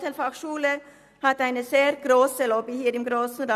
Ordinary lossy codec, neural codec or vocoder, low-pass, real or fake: none; none; 14.4 kHz; real